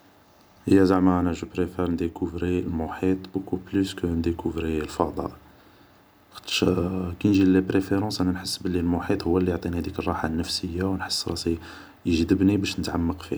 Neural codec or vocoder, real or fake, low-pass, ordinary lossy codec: none; real; none; none